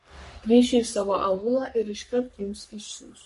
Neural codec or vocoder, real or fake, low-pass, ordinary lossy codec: codec, 44.1 kHz, 3.4 kbps, Pupu-Codec; fake; 14.4 kHz; MP3, 48 kbps